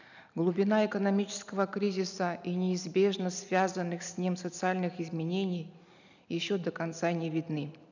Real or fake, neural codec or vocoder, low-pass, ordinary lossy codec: real; none; 7.2 kHz; none